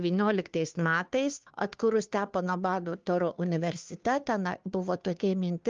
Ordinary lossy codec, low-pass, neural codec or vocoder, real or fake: Opus, 24 kbps; 7.2 kHz; codec, 16 kHz, 2 kbps, FunCodec, trained on Chinese and English, 25 frames a second; fake